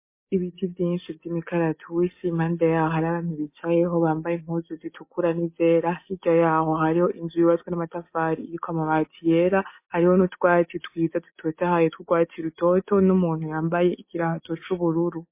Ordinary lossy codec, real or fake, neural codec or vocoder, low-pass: MP3, 24 kbps; real; none; 3.6 kHz